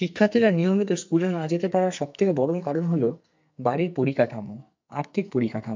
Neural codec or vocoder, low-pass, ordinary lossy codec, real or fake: codec, 44.1 kHz, 2.6 kbps, SNAC; 7.2 kHz; MP3, 64 kbps; fake